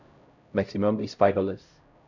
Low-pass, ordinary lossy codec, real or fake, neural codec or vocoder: 7.2 kHz; none; fake; codec, 16 kHz, 0.5 kbps, X-Codec, HuBERT features, trained on LibriSpeech